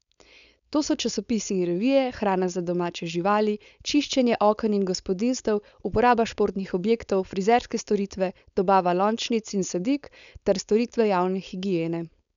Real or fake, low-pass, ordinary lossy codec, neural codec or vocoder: fake; 7.2 kHz; none; codec, 16 kHz, 4.8 kbps, FACodec